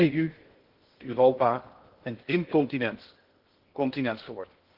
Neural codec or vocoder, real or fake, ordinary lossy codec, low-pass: codec, 16 kHz in and 24 kHz out, 0.6 kbps, FocalCodec, streaming, 2048 codes; fake; Opus, 16 kbps; 5.4 kHz